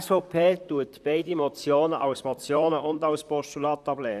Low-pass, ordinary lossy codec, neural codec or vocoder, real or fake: 14.4 kHz; none; vocoder, 44.1 kHz, 128 mel bands, Pupu-Vocoder; fake